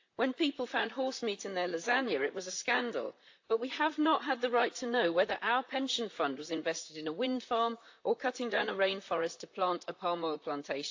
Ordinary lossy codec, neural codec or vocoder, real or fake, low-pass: AAC, 48 kbps; vocoder, 44.1 kHz, 128 mel bands, Pupu-Vocoder; fake; 7.2 kHz